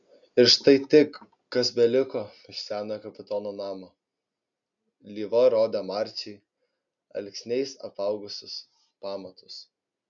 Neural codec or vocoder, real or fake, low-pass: none; real; 7.2 kHz